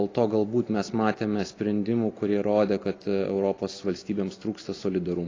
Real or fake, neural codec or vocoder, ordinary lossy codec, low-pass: real; none; AAC, 32 kbps; 7.2 kHz